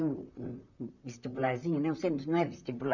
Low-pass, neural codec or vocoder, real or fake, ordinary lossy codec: 7.2 kHz; vocoder, 44.1 kHz, 128 mel bands, Pupu-Vocoder; fake; none